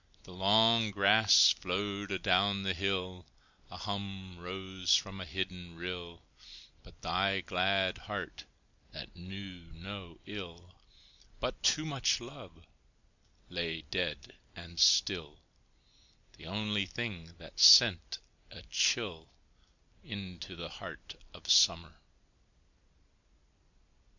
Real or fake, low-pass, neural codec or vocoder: real; 7.2 kHz; none